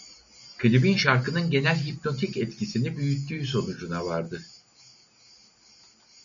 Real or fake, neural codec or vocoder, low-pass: real; none; 7.2 kHz